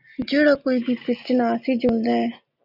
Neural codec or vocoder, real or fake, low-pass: none; real; 5.4 kHz